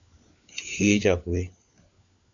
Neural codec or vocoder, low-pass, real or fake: codec, 16 kHz, 4 kbps, FunCodec, trained on LibriTTS, 50 frames a second; 7.2 kHz; fake